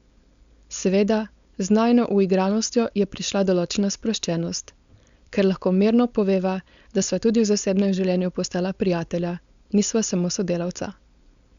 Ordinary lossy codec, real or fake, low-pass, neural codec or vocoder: Opus, 64 kbps; fake; 7.2 kHz; codec, 16 kHz, 4.8 kbps, FACodec